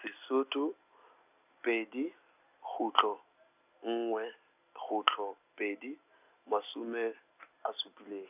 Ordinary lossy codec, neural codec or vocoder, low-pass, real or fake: none; none; 3.6 kHz; real